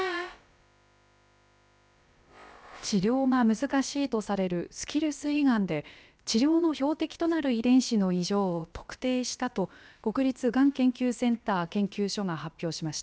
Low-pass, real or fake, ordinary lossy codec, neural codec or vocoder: none; fake; none; codec, 16 kHz, about 1 kbps, DyCAST, with the encoder's durations